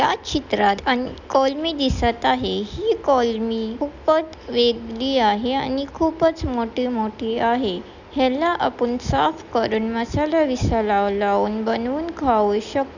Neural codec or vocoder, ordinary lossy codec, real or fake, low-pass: none; none; real; 7.2 kHz